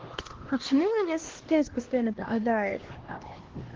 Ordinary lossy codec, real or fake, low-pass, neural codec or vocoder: Opus, 16 kbps; fake; 7.2 kHz; codec, 16 kHz, 1 kbps, X-Codec, HuBERT features, trained on LibriSpeech